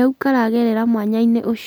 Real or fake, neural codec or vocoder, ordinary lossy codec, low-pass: real; none; none; none